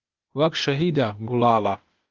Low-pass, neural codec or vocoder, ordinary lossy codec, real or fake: 7.2 kHz; codec, 16 kHz, 0.8 kbps, ZipCodec; Opus, 32 kbps; fake